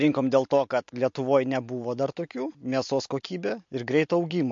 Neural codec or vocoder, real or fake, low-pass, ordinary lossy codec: none; real; 7.2 kHz; MP3, 48 kbps